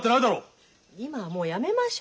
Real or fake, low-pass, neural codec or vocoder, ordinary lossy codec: real; none; none; none